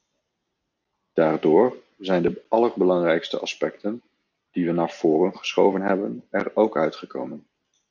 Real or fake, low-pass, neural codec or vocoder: real; 7.2 kHz; none